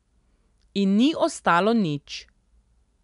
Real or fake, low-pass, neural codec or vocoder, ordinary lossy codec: real; 10.8 kHz; none; none